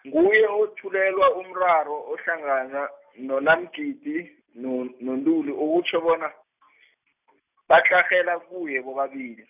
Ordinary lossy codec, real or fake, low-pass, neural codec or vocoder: none; real; 3.6 kHz; none